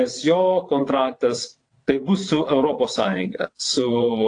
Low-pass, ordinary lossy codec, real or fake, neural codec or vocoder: 9.9 kHz; AAC, 48 kbps; fake; vocoder, 22.05 kHz, 80 mel bands, WaveNeXt